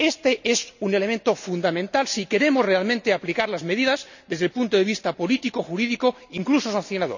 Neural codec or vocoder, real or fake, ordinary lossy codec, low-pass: none; real; none; 7.2 kHz